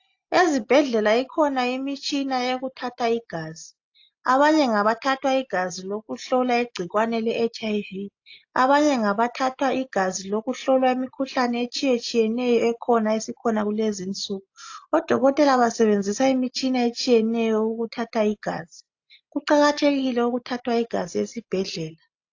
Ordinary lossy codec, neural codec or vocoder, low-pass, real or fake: AAC, 48 kbps; none; 7.2 kHz; real